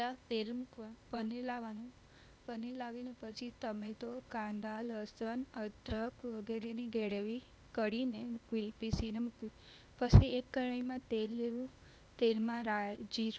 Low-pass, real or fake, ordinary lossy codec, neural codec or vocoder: none; fake; none; codec, 16 kHz, 0.8 kbps, ZipCodec